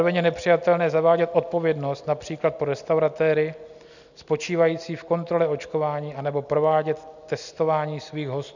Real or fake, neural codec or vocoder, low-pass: real; none; 7.2 kHz